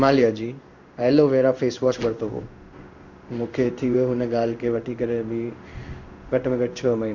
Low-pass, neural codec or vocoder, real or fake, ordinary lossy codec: 7.2 kHz; codec, 16 kHz in and 24 kHz out, 1 kbps, XY-Tokenizer; fake; none